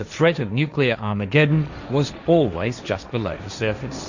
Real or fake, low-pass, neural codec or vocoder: fake; 7.2 kHz; codec, 16 kHz, 1.1 kbps, Voila-Tokenizer